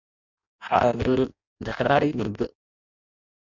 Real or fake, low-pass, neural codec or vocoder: fake; 7.2 kHz; codec, 16 kHz in and 24 kHz out, 0.6 kbps, FireRedTTS-2 codec